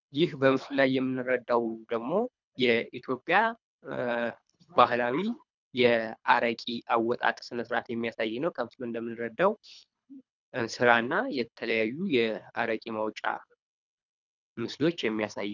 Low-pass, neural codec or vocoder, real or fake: 7.2 kHz; codec, 24 kHz, 3 kbps, HILCodec; fake